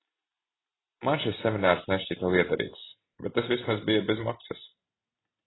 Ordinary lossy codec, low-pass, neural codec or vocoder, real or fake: AAC, 16 kbps; 7.2 kHz; none; real